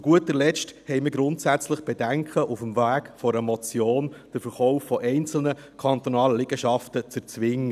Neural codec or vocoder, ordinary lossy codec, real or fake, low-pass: none; none; real; 14.4 kHz